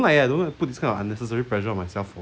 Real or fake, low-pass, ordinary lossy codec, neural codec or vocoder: real; none; none; none